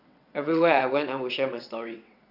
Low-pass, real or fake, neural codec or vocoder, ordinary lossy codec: 5.4 kHz; fake; vocoder, 22.05 kHz, 80 mel bands, WaveNeXt; none